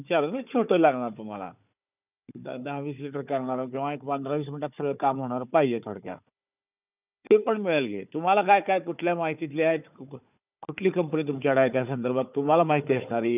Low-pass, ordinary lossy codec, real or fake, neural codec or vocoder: 3.6 kHz; none; fake; codec, 16 kHz, 4 kbps, FunCodec, trained on Chinese and English, 50 frames a second